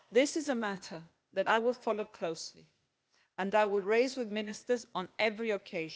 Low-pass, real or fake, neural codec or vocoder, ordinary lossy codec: none; fake; codec, 16 kHz, 0.8 kbps, ZipCodec; none